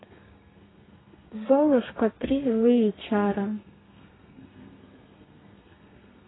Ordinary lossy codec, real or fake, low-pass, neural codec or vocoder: AAC, 16 kbps; fake; 7.2 kHz; codec, 32 kHz, 1.9 kbps, SNAC